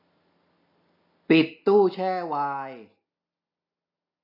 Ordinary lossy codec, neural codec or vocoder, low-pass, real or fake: MP3, 32 kbps; none; 5.4 kHz; real